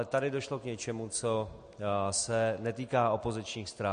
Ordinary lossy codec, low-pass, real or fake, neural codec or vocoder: MP3, 48 kbps; 9.9 kHz; real; none